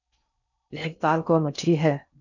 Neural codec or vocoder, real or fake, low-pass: codec, 16 kHz in and 24 kHz out, 0.6 kbps, FocalCodec, streaming, 4096 codes; fake; 7.2 kHz